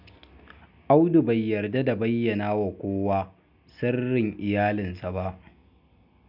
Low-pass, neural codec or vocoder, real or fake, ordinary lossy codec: 5.4 kHz; none; real; none